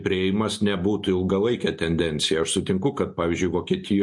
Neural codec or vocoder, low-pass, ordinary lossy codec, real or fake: none; 10.8 kHz; MP3, 48 kbps; real